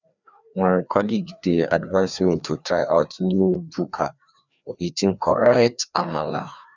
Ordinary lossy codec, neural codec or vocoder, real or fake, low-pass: none; codec, 16 kHz, 2 kbps, FreqCodec, larger model; fake; 7.2 kHz